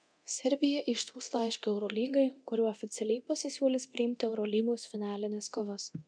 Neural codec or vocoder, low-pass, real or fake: codec, 24 kHz, 0.9 kbps, DualCodec; 9.9 kHz; fake